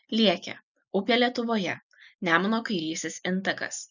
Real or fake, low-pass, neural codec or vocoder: real; 7.2 kHz; none